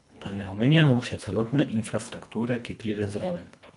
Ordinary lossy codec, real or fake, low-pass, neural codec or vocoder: none; fake; 10.8 kHz; codec, 24 kHz, 1.5 kbps, HILCodec